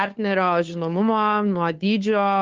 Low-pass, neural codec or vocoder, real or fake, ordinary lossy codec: 7.2 kHz; codec, 16 kHz, 2 kbps, FunCodec, trained on Chinese and English, 25 frames a second; fake; Opus, 24 kbps